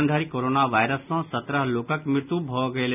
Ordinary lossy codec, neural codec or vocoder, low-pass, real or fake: none; none; 3.6 kHz; real